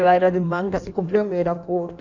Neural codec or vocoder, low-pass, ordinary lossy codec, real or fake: codec, 16 kHz in and 24 kHz out, 1.1 kbps, FireRedTTS-2 codec; 7.2 kHz; none; fake